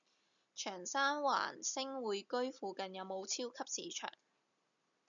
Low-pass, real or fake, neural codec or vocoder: 7.2 kHz; real; none